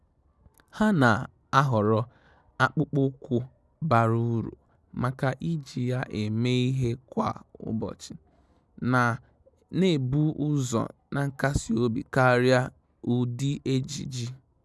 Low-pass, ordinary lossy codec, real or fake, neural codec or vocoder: none; none; real; none